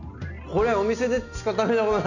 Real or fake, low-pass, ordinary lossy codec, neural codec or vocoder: real; 7.2 kHz; none; none